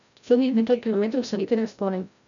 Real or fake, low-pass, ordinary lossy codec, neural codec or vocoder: fake; 7.2 kHz; MP3, 96 kbps; codec, 16 kHz, 0.5 kbps, FreqCodec, larger model